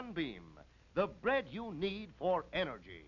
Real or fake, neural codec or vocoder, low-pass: real; none; 7.2 kHz